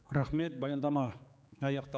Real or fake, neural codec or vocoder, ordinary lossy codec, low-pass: fake; codec, 16 kHz, 4 kbps, X-Codec, HuBERT features, trained on general audio; none; none